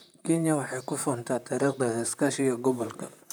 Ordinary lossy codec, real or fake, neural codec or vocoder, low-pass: none; fake; vocoder, 44.1 kHz, 128 mel bands, Pupu-Vocoder; none